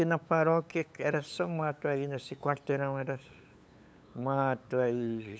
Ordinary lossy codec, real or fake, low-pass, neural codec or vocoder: none; fake; none; codec, 16 kHz, 8 kbps, FunCodec, trained on LibriTTS, 25 frames a second